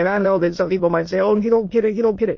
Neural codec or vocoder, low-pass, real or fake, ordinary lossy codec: autoencoder, 22.05 kHz, a latent of 192 numbers a frame, VITS, trained on many speakers; 7.2 kHz; fake; MP3, 32 kbps